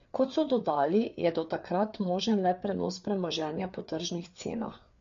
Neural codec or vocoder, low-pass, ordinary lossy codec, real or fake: codec, 16 kHz, 4 kbps, FreqCodec, larger model; 7.2 kHz; MP3, 48 kbps; fake